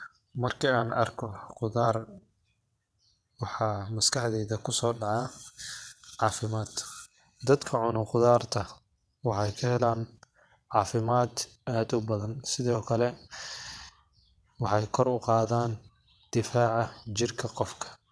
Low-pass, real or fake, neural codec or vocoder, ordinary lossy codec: none; fake; vocoder, 22.05 kHz, 80 mel bands, WaveNeXt; none